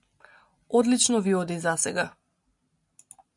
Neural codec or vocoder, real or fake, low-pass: none; real; 10.8 kHz